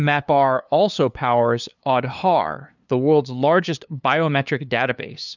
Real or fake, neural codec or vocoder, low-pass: fake; codec, 16 kHz, 2 kbps, FunCodec, trained on LibriTTS, 25 frames a second; 7.2 kHz